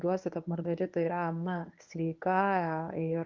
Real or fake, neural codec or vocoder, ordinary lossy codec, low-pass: fake; codec, 24 kHz, 0.9 kbps, WavTokenizer, medium speech release version 1; Opus, 32 kbps; 7.2 kHz